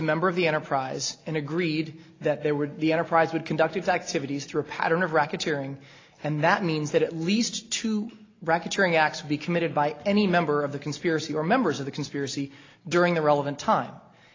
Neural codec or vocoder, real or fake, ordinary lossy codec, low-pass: none; real; AAC, 32 kbps; 7.2 kHz